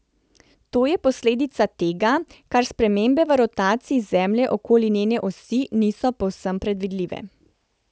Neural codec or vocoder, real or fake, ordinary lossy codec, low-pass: none; real; none; none